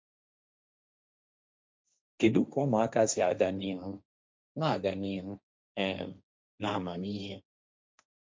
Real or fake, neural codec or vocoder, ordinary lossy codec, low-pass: fake; codec, 16 kHz, 1.1 kbps, Voila-Tokenizer; none; none